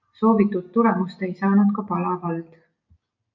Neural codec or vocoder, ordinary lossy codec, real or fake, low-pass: none; MP3, 64 kbps; real; 7.2 kHz